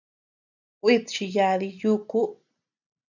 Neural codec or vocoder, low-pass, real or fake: none; 7.2 kHz; real